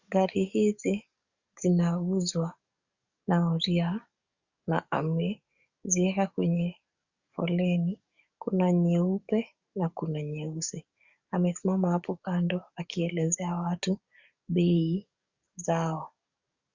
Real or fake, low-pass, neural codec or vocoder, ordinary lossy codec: fake; 7.2 kHz; codec, 44.1 kHz, 7.8 kbps, DAC; Opus, 64 kbps